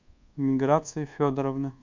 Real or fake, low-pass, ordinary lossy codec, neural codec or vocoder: fake; 7.2 kHz; MP3, 64 kbps; codec, 24 kHz, 1.2 kbps, DualCodec